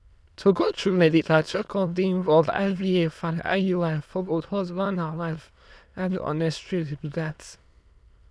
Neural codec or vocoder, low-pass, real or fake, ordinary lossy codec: autoencoder, 22.05 kHz, a latent of 192 numbers a frame, VITS, trained on many speakers; none; fake; none